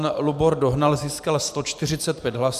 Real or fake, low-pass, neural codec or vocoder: fake; 14.4 kHz; vocoder, 44.1 kHz, 128 mel bands every 512 samples, BigVGAN v2